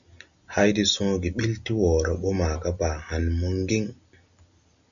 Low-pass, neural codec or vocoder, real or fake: 7.2 kHz; none; real